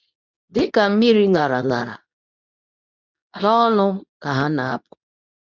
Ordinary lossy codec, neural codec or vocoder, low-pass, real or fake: none; codec, 24 kHz, 0.9 kbps, WavTokenizer, medium speech release version 2; 7.2 kHz; fake